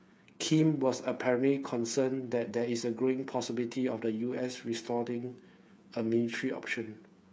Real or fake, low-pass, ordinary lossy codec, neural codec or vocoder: fake; none; none; codec, 16 kHz, 8 kbps, FreqCodec, smaller model